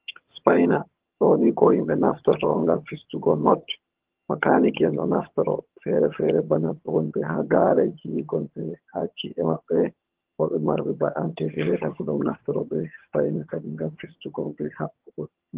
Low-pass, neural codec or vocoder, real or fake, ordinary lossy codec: 3.6 kHz; vocoder, 22.05 kHz, 80 mel bands, HiFi-GAN; fake; Opus, 16 kbps